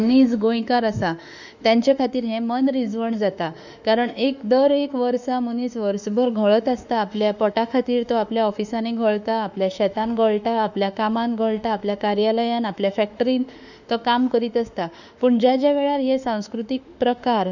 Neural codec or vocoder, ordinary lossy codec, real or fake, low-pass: autoencoder, 48 kHz, 32 numbers a frame, DAC-VAE, trained on Japanese speech; none; fake; 7.2 kHz